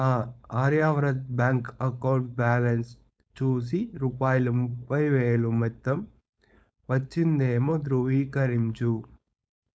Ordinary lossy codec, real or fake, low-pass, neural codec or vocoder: none; fake; none; codec, 16 kHz, 4.8 kbps, FACodec